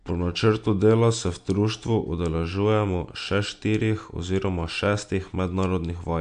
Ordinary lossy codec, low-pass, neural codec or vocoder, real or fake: MP3, 64 kbps; 9.9 kHz; none; real